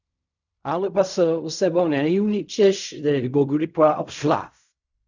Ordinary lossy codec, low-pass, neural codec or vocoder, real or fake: none; 7.2 kHz; codec, 16 kHz in and 24 kHz out, 0.4 kbps, LongCat-Audio-Codec, fine tuned four codebook decoder; fake